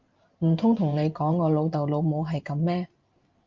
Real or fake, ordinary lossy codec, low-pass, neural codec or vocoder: fake; Opus, 24 kbps; 7.2 kHz; vocoder, 44.1 kHz, 128 mel bands every 512 samples, BigVGAN v2